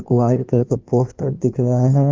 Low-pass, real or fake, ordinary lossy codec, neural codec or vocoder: 7.2 kHz; fake; Opus, 32 kbps; codec, 16 kHz in and 24 kHz out, 1.1 kbps, FireRedTTS-2 codec